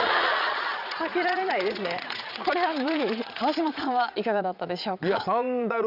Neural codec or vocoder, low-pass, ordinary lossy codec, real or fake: vocoder, 22.05 kHz, 80 mel bands, Vocos; 5.4 kHz; none; fake